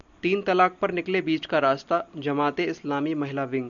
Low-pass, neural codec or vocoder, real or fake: 7.2 kHz; none; real